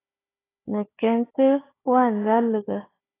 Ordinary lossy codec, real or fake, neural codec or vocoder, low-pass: AAC, 16 kbps; fake; codec, 16 kHz, 4 kbps, FunCodec, trained on Chinese and English, 50 frames a second; 3.6 kHz